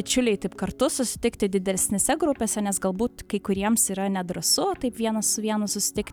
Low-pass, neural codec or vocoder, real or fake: 19.8 kHz; none; real